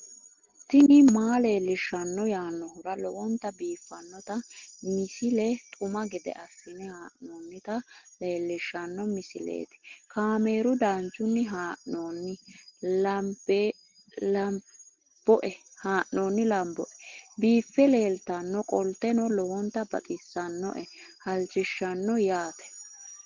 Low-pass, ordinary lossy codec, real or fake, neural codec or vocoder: 7.2 kHz; Opus, 16 kbps; real; none